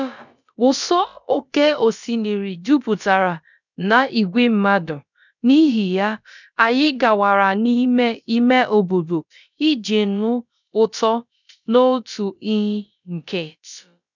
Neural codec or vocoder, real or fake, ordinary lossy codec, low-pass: codec, 16 kHz, about 1 kbps, DyCAST, with the encoder's durations; fake; none; 7.2 kHz